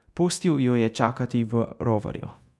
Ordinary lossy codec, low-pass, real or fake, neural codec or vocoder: none; none; fake; codec, 24 kHz, 0.9 kbps, DualCodec